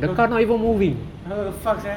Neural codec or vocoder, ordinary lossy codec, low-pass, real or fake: none; none; 19.8 kHz; real